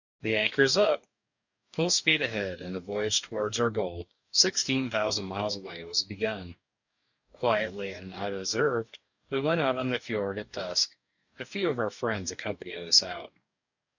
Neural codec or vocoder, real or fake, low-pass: codec, 44.1 kHz, 2.6 kbps, DAC; fake; 7.2 kHz